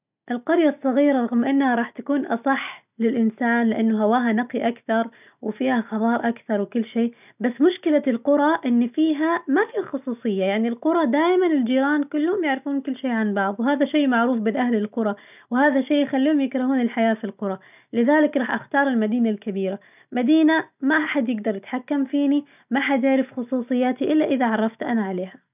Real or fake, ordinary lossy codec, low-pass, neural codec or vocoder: real; none; 3.6 kHz; none